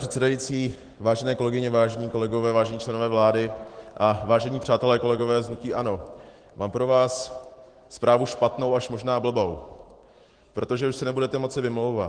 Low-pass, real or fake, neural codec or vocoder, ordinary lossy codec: 9.9 kHz; fake; autoencoder, 48 kHz, 128 numbers a frame, DAC-VAE, trained on Japanese speech; Opus, 16 kbps